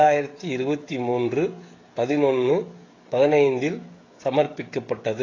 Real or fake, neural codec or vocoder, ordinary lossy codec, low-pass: fake; codec, 16 kHz, 16 kbps, FreqCodec, smaller model; AAC, 32 kbps; 7.2 kHz